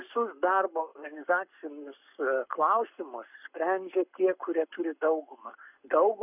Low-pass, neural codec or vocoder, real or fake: 3.6 kHz; codec, 44.1 kHz, 7.8 kbps, Pupu-Codec; fake